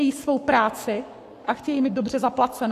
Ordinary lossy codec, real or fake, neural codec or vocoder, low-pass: AAC, 64 kbps; fake; codec, 44.1 kHz, 7.8 kbps, Pupu-Codec; 14.4 kHz